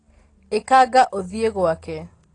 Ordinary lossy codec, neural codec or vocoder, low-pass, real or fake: AAC, 32 kbps; none; 9.9 kHz; real